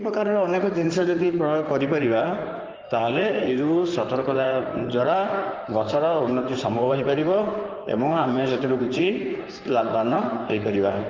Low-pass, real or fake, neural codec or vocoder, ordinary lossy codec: 7.2 kHz; fake; codec, 16 kHz in and 24 kHz out, 2.2 kbps, FireRedTTS-2 codec; Opus, 32 kbps